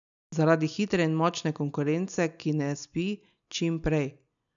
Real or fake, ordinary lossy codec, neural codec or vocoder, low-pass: real; none; none; 7.2 kHz